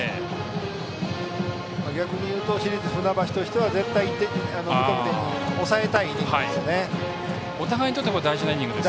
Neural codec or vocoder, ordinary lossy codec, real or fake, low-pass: none; none; real; none